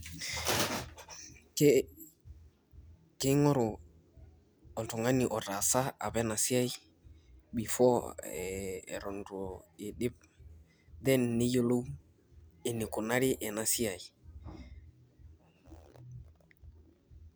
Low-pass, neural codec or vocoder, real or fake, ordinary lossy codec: none; none; real; none